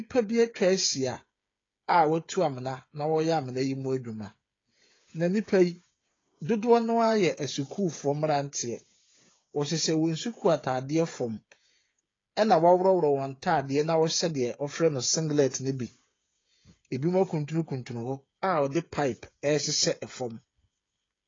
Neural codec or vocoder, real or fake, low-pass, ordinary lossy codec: codec, 16 kHz, 8 kbps, FreqCodec, smaller model; fake; 7.2 kHz; AAC, 32 kbps